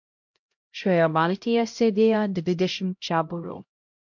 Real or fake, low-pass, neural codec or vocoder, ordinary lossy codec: fake; 7.2 kHz; codec, 16 kHz, 0.5 kbps, X-Codec, HuBERT features, trained on LibriSpeech; MP3, 48 kbps